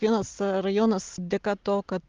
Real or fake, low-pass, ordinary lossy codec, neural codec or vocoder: real; 7.2 kHz; Opus, 32 kbps; none